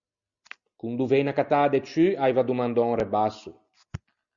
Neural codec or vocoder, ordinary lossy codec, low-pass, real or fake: none; Opus, 64 kbps; 7.2 kHz; real